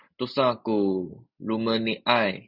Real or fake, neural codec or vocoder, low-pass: real; none; 5.4 kHz